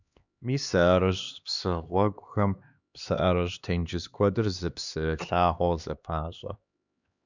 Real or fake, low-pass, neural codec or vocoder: fake; 7.2 kHz; codec, 16 kHz, 2 kbps, X-Codec, HuBERT features, trained on LibriSpeech